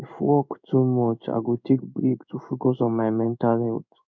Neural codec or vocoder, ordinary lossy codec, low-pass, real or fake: codec, 16 kHz in and 24 kHz out, 1 kbps, XY-Tokenizer; none; 7.2 kHz; fake